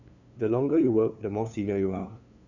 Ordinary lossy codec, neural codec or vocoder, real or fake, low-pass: none; codec, 16 kHz, 2 kbps, FunCodec, trained on LibriTTS, 25 frames a second; fake; 7.2 kHz